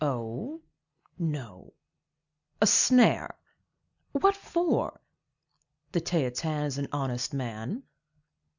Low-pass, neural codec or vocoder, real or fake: 7.2 kHz; none; real